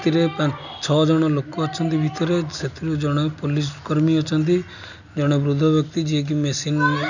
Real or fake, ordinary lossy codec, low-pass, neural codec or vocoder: real; none; 7.2 kHz; none